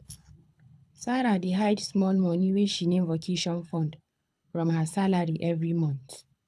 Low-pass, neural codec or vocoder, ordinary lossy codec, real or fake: none; codec, 24 kHz, 6 kbps, HILCodec; none; fake